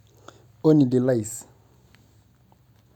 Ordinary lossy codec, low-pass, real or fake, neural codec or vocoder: none; 19.8 kHz; real; none